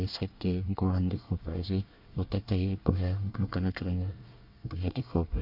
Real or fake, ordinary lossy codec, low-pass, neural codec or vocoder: fake; none; 5.4 kHz; codec, 24 kHz, 1 kbps, SNAC